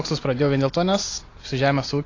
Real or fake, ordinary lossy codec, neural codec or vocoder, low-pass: real; AAC, 32 kbps; none; 7.2 kHz